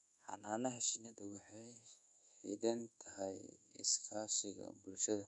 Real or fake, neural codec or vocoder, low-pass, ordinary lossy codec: fake; codec, 24 kHz, 1.2 kbps, DualCodec; 10.8 kHz; none